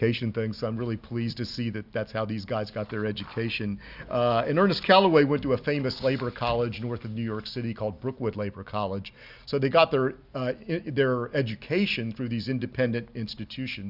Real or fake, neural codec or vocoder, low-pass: real; none; 5.4 kHz